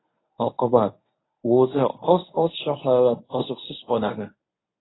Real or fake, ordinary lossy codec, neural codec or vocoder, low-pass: fake; AAC, 16 kbps; codec, 24 kHz, 0.9 kbps, WavTokenizer, medium speech release version 1; 7.2 kHz